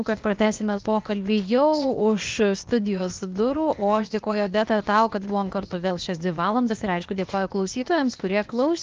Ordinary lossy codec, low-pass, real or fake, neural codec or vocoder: Opus, 24 kbps; 7.2 kHz; fake; codec, 16 kHz, 0.8 kbps, ZipCodec